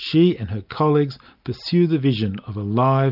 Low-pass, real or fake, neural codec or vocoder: 5.4 kHz; real; none